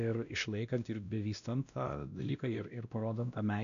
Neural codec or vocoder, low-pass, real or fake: codec, 16 kHz, 1 kbps, X-Codec, WavLM features, trained on Multilingual LibriSpeech; 7.2 kHz; fake